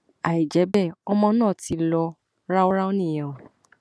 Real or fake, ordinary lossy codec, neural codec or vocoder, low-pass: real; none; none; none